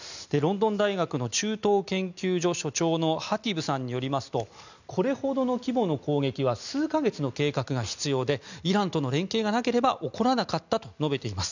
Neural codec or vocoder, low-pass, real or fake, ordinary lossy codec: none; 7.2 kHz; real; none